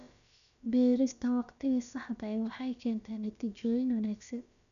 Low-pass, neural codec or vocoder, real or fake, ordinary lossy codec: 7.2 kHz; codec, 16 kHz, about 1 kbps, DyCAST, with the encoder's durations; fake; none